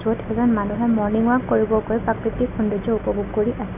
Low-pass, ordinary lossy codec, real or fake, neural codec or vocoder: 3.6 kHz; none; real; none